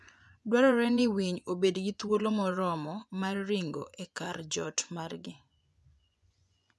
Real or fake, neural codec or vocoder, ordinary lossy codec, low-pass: fake; vocoder, 24 kHz, 100 mel bands, Vocos; none; none